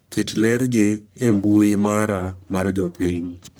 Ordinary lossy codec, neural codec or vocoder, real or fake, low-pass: none; codec, 44.1 kHz, 1.7 kbps, Pupu-Codec; fake; none